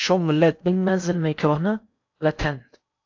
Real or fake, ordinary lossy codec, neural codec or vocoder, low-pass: fake; AAC, 48 kbps; codec, 16 kHz in and 24 kHz out, 0.6 kbps, FocalCodec, streaming, 4096 codes; 7.2 kHz